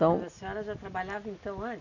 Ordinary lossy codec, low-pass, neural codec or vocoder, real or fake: none; 7.2 kHz; none; real